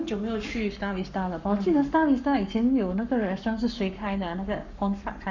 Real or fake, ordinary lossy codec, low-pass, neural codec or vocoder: fake; none; 7.2 kHz; codec, 44.1 kHz, 7.8 kbps, Pupu-Codec